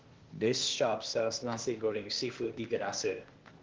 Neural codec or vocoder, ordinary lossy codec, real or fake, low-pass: codec, 16 kHz, 0.8 kbps, ZipCodec; Opus, 16 kbps; fake; 7.2 kHz